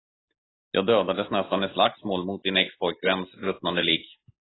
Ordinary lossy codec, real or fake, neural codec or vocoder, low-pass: AAC, 16 kbps; real; none; 7.2 kHz